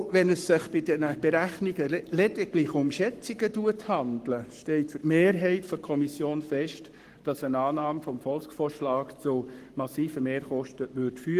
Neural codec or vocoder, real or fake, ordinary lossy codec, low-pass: codec, 44.1 kHz, 7.8 kbps, Pupu-Codec; fake; Opus, 24 kbps; 14.4 kHz